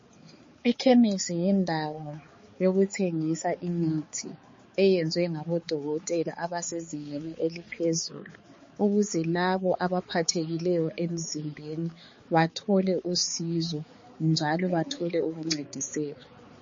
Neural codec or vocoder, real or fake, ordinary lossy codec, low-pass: codec, 16 kHz, 4 kbps, X-Codec, HuBERT features, trained on balanced general audio; fake; MP3, 32 kbps; 7.2 kHz